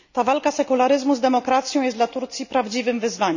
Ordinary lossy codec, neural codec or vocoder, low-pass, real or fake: none; none; 7.2 kHz; real